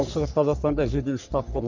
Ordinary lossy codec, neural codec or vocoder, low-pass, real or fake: none; codec, 44.1 kHz, 3.4 kbps, Pupu-Codec; 7.2 kHz; fake